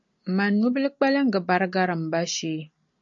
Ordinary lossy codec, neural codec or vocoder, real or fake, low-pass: MP3, 48 kbps; none; real; 7.2 kHz